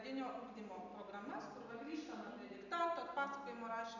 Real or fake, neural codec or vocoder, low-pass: real; none; 7.2 kHz